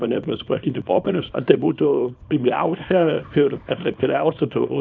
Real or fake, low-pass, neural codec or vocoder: fake; 7.2 kHz; codec, 24 kHz, 0.9 kbps, WavTokenizer, small release